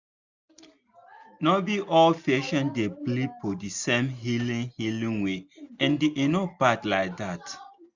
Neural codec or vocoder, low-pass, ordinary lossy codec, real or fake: none; 7.2 kHz; none; real